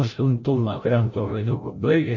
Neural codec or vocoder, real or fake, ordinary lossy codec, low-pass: codec, 16 kHz, 0.5 kbps, FreqCodec, larger model; fake; MP3, 32 kbps; 7.2 kHz